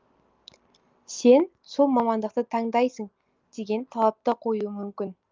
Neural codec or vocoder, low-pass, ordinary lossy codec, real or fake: none; 7.2 kHz; Opus, 24 kbps; real